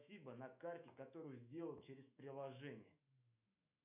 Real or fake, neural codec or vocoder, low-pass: fake; autoencoder, 48 kHz, 128 numbers a frame, DAC-VAE, trained on Japanese speech; 3.6 kHz